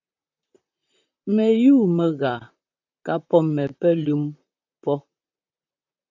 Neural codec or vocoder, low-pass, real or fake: vocoder, 44.1 kHz, 128 mel bands, Pupu-Vocoder; 7.2 kHz; fake